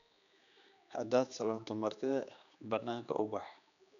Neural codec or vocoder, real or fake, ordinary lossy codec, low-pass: codec, 16 kHz, 2 kbps, X-Codec, HuBERT features, trained on general audio; fake; none; 7.2 kHz